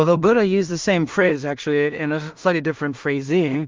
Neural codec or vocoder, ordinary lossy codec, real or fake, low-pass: codec, 16 kHz in and 24 kHz out, 0.4 kbps, LongCat-Audio-Codec, two codebook decoder; Opus, 32 kbps; fake; 7.2 kHz